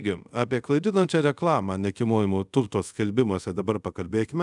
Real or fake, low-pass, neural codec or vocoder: fake; 10.8 kHz; codec, 24 kHz, 0.5 kbps, DualCodec